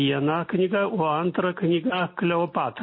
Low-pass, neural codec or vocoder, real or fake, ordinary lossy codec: 5.4 kHz; none; real; MP3, 24 kbps